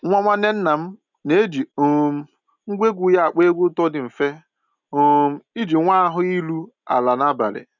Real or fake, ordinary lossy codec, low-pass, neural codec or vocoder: real; none; 7.2 kHz; none